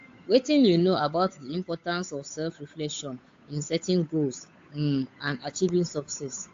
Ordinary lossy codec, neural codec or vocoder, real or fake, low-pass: AAC, 48 kbps; codec, 16 kHz, 8 kbps, FunCodec, trained on Chinese and English, 25 frames a second; fake; 7.2 kHz